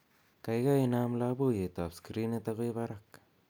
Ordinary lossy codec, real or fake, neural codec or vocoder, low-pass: none; real; none; none